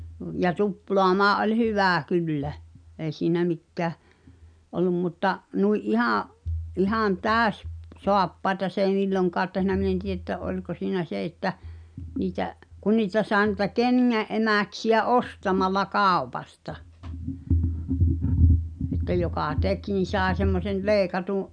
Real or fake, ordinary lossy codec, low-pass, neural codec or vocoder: real; none; 9.9 kHz; none